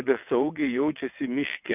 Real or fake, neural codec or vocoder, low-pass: fake; vocoder, 22.05 kHz, 80 mel bands, WaveNeXt; 3.6 kHz